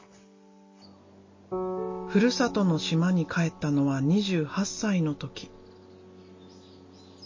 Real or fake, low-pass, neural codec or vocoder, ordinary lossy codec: real; 7.2 kHz; none; MP3, 32 kbps